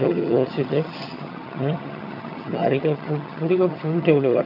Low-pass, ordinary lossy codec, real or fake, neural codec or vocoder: 5.4 kHz; AAC, 48 kbps; fake; vocoder, 22.05 kHz, 80 mel bands, HiFi-GAN